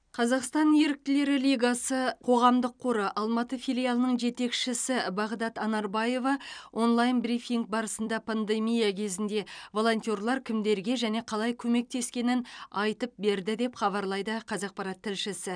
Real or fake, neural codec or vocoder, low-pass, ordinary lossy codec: fake; vocoder, 44.1 kHz, 128 mel bands every 256 samples, BigVGAN v2; 9.9 kHz; none